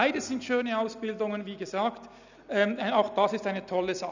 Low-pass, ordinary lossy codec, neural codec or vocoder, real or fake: 7.2 kHz; none; none; real